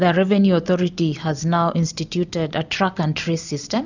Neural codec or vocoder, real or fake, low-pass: none; real; 7.2 kHz